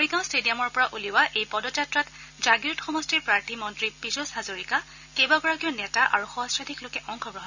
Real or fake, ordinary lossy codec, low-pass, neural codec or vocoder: real; none; 7.2 kHz; none